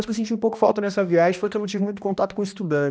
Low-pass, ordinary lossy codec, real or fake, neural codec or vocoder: none; none; fake; codec, 16 kHz, 1 kbps, X-Codec, HuBERT features, trained on balanced general audio